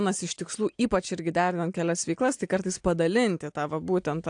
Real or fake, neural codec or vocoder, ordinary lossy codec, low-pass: real; none; AAC, 64 kbps; 9.9 kHz